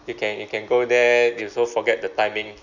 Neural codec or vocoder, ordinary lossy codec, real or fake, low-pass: none; none; real; 7.2 kHz